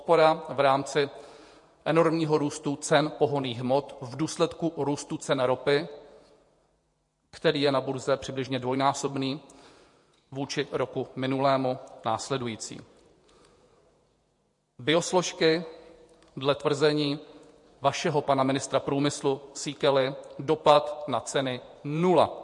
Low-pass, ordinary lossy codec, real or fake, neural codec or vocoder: 10.8 kHz; MP3, 48 kbps; fake; vocoder, 48 kHz, 128 mel bands, Vocos